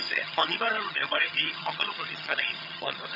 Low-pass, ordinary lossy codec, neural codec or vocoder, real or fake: 5.4 kHz; none; vocoder, 22.05 kHz, 80 mel bands, HiFi-GAN; fake